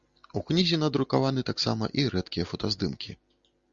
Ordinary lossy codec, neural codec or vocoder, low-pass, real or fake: Opus, 64 kbps; none; 7.2 kHz; real